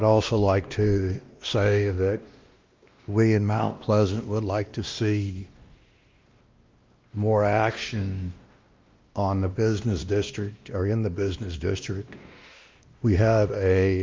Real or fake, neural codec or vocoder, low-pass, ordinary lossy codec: fake; codec, 16 kHz, 1 kbps, X-Codec, WavLM features, trained on Multilingual LibriSpeech; 7.2 kHz; Opus, 24 kbps